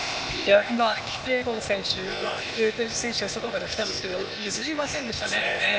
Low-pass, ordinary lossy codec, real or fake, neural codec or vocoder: none; none; fake; codec, 16 kHz, 0.8 kbps, ZipCodec